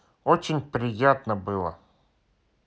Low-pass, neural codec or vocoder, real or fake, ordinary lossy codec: none; none; real; none